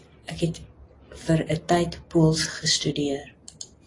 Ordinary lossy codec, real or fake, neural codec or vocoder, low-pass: AAC, 32 kbps; real; none; 10.8 kHz